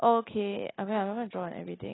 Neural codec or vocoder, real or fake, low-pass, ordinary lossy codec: none; real; 7.2 kHz; AAC, 16 kbps